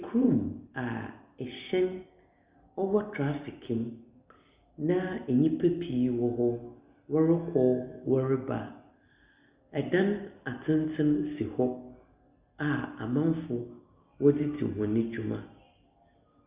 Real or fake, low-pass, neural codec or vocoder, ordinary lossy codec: real; 3.6 kHz; none; Opus, 64 kbps